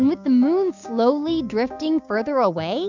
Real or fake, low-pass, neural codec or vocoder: fake; 7.2 kHz; codec, 16 kHz, 6 kbps, DAC